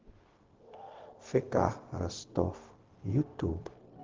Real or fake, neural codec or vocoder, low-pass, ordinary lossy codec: fake; codec, 16 kHz, 0.4 kbps, LongCat-Audio-Codec; 7.2 kHz; Opus, 16 kbps